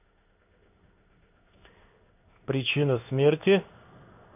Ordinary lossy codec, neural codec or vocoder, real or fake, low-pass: none; codec, 16 kHz in and 24 kHz out, 1 kbps, XY-Tokenizer; fake; 3.6 kHz